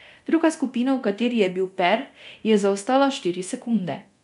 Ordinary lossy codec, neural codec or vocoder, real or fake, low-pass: none; codec, 24 kHz, 0.9 kbps, DualCodec; fake; 10.8 kHz